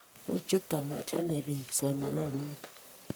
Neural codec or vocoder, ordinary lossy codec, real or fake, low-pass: codec, 44.1 kHz, 1.7 kbps, Pupu-Codec; none; fake; none